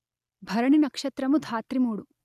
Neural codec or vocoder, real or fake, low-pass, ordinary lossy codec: none; real; 14.4 kHz; none